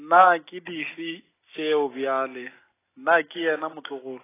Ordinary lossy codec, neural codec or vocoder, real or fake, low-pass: AAC, 16 kbps; none; real; 3.6 kHz